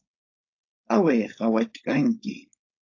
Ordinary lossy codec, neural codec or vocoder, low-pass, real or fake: AAC, 48 kbps; codec, 16 kHz, 4.8 kbps, FACodec; 7.2 kHz; fake